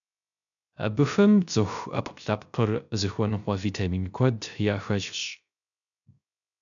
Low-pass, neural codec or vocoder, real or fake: 7.2 kHz; codec, 16 kHz, 0.3 kbps, FocalCodec; fake